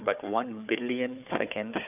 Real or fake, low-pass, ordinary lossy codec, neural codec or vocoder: fake; 3.6 kHz; none; codec, 16 kHz, 4 kbps, FunCodec, trained on Chinese and English, 50 frames a second